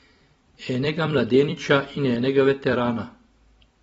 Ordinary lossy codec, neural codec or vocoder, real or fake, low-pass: AAC, 24 kbps; vocoder, 44.1 kHz, 128 mel bands every 256 samples, BigVGAN v2; fake; 19.8 kHz